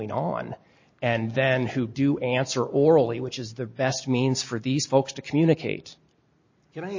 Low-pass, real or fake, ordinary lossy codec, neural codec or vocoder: 7.2 kHz; real; MP3, 32 kbps; none